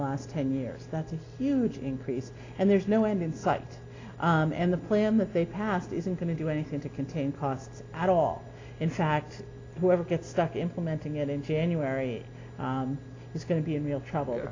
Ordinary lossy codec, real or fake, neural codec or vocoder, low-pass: AAC, 32 kbps; real; none; 7.2 kHz